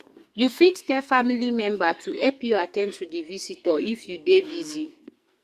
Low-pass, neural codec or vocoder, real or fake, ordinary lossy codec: 14.4 kHz; codec, 44.1 kHz, 2.6 kbps, SNAC; fake; Opus, 64 kbps